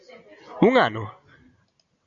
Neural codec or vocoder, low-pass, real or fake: none; 7.2 kHz; real